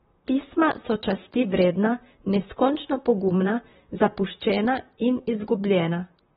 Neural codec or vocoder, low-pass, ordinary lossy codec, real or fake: vocoder, 44.1 kHz, 128 mel bands, Pupu-Vocoder; 19.8 kHz; AAC, 16 kbps; fake